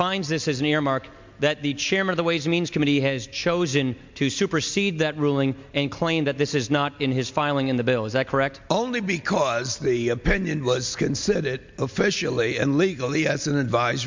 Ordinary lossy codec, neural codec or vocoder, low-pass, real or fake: MP3, 64 kbps; none; 7.2 kHz; real